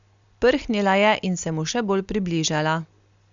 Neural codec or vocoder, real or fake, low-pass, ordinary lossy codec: none; real; 7.2 kHz; Opus, 64 kbps